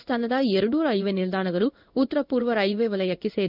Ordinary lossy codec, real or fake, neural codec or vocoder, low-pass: none; fake; codec, 16 kHz in and 24 kHz out, 1 kbps, XY-Tokenizer; 5.4 kHz